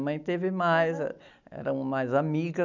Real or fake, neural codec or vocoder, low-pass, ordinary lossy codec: real; none; 7.2 kHz; none